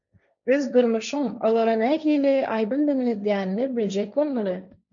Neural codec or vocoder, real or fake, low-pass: codec, 16 kHz, 1.1 kbps, Voila-Tokenizer; fake; 7.2 kHz